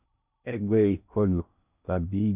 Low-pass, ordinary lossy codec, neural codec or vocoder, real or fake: 3.6 kHz; none; codec, 16 kHz in and 24 kHz out, 0.6 kbps, FocalCodec, streaming, 2048 codes; fake